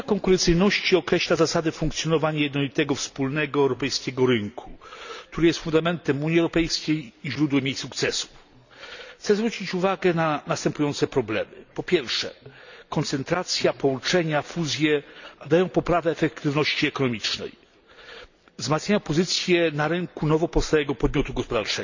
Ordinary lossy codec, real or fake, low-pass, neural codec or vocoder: none; real; 7.2 kHz; none